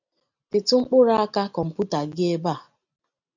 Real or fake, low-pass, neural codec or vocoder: real; 7.2 kHz; none